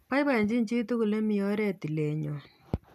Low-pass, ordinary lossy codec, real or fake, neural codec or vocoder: 14.4 kHz; none; real; none